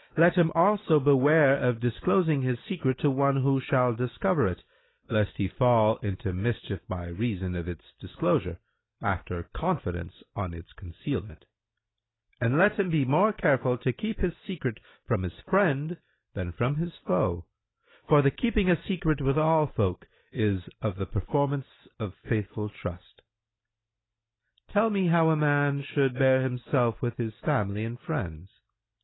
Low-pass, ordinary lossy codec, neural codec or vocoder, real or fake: 7.2 kHz; AAC, 16 kbps; none; real